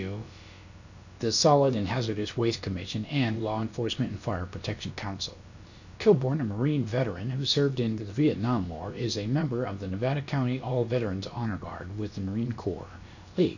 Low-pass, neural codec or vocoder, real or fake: 7.2 kHz; codec, 16 kHz, about 1 kbps, DyCAST, with the encoder's durations; fake